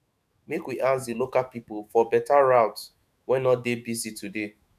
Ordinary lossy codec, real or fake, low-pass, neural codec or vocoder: none; fake; 14.4 kHz; autoencoder, 48 kHz, 128 numbers a frame, DAC-VAE, trained on Japanese speech